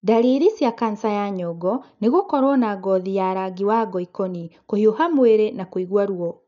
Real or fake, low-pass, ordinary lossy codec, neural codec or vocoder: real; 7.2 kHz; none; none